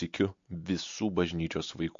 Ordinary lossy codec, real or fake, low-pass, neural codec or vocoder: MP3, 48 kbps; real; 7.2 kHz; none